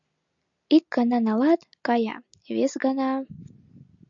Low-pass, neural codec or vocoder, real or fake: 7.2 kHz; none; real